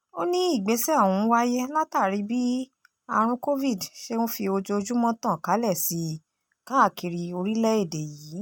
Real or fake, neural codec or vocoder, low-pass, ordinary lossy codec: real; none; none; none